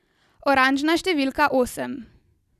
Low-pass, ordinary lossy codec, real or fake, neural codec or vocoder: 14.4 kHz; none; real; none